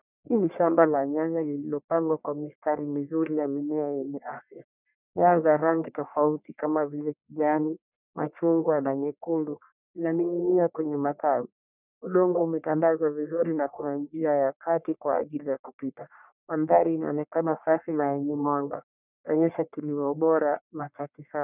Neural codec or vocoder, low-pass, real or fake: codec, 44.1 kHz, 1.7 kbps, Pupu-Codec; 3.6 kHz; fake